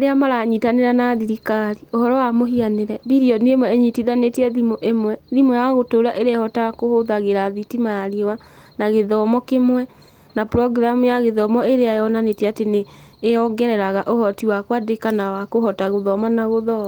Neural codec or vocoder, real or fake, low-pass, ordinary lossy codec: none; real; 19.8 kHz; Opus, 24 kbps